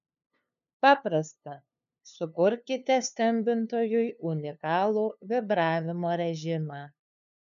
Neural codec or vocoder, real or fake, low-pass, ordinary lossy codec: codec, 16 kHz, 2 kbps, FunCodec, trained on LibriTTS, 25 frames a second; fake; 7.2 kHz; MP3, 96 kbps